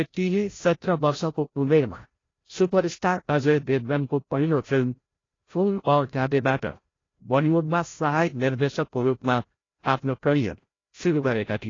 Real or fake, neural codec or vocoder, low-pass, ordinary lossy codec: fake; codec, 16 kHz, 0.5 kbps, FreqCodec, larger model; 7.2 kHz; AAC, 32 kbps